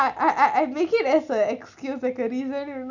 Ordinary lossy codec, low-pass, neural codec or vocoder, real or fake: none; 7.2 kHz; none; real